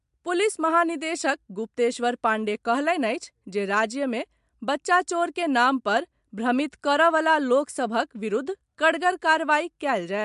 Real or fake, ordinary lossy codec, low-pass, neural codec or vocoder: real; MP3, 64 kbps; 10.8 kHz; none